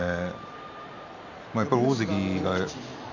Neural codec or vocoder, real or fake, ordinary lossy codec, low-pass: none; real; none; 7.2 kHz